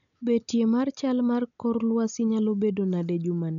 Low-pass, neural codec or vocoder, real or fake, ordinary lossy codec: 7.2 kHz; none; real; MP3, 96 kbps